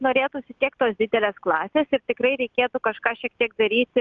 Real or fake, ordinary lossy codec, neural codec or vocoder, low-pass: real; Opus, 24 kbps; none; 7.2 kHz